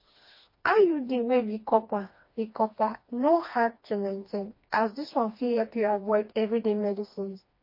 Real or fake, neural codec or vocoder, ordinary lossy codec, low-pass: fake; codec, 16 kHz, 2 kbps, FreqCodec, smaller model; MP3, 32 kbps; 5.4 kHz